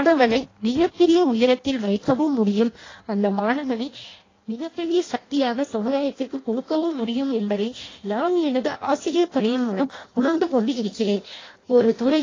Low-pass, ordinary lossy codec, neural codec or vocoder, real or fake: 7.2 kHz; AAC, 32 kbps; codec, 16 kHz in and 24 kHz out, 0.6 kbps, FireRedTTS-2 codec; fake